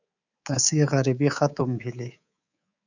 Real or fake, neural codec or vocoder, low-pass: fake; codec, 24 kHz, 3.1 kbps, DualCodec; 7.2 kHz